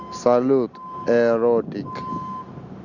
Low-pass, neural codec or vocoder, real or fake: 7.2 kHz; none; real